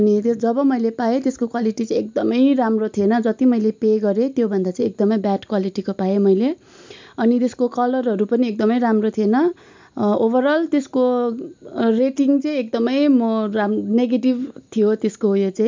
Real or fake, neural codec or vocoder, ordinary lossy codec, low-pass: real; none; MP3, 64 kbps; 7.2 kHz